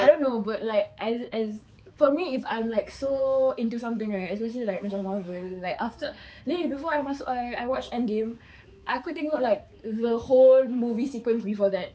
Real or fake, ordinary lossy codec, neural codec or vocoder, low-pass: fake; none; codec, 16 kHz, 4 kbps, X-Codec, HuBERT features, trained on balanced general audio; none